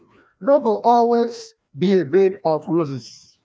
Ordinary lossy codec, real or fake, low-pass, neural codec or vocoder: none; fake; none; codec, 16 kHz, 1 kbps, FreqCodec, larger model